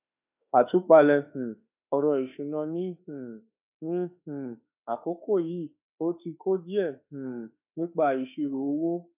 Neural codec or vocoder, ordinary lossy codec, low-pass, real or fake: autoencoder, 48 kHz, 32 numbers a frame, DAC-VAE, trained on Japanese speech; none; 3.6 kHz; fake